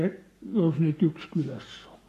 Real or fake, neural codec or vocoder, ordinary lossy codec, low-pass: fake; codec, 44.1 kHz, 7.8 kbps, Pupu-Codec; AAC, 48 kbps; 14.4 kHz